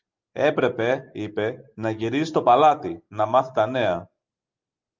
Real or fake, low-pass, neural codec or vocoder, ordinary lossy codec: real; 7.2 kHz; none; Opus, 24 kbps